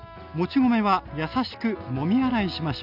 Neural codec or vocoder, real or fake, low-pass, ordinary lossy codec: none; real; 5.4 kHz; none